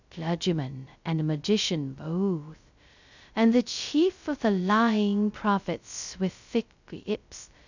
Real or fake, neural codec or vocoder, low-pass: fake; codec, 16 kHz, 0.2 kbps, FocalCodec; 7.2 kHz